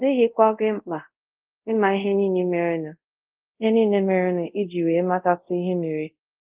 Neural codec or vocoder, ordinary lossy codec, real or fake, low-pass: codec, 24 kHz, 0.5 kbps, DualCodec; Opus, 32 kbps; fake; 3.6 kHz